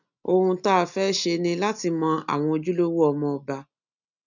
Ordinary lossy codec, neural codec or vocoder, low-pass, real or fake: none; none; 7.2 kHz; real